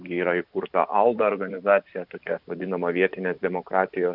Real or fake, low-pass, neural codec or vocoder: fake; 5.4 kHz; vocoder, 44.1 kHz, 128 mel bands every 512 samples, BigVGAN v2